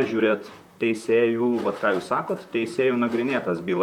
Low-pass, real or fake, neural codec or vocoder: 19.8 kHz; fake; vocoder, 44.1 kHz, 128 mel bands, Pupu-Vocoder